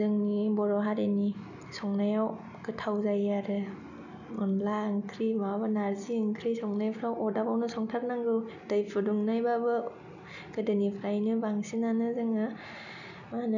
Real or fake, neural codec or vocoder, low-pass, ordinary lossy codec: real; none; 7.2 kHz; none